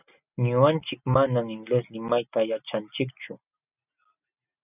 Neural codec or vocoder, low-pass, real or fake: none; 3.6 kHz; real